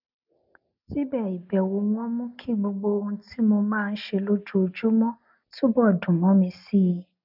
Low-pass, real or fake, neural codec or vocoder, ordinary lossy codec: 5.4 kHz; real; none; none